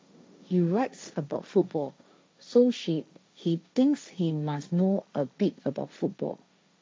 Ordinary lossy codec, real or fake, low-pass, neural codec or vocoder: none; fake; none; codec, 16 kHz, 1.1 kbps, Voila-Tokenizer